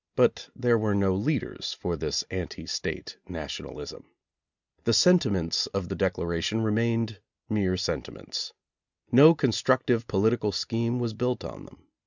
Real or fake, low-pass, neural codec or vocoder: real; 7.2 kHz; none